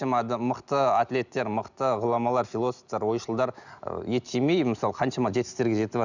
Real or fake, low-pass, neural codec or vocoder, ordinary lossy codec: real; 7.2 kHz; none; none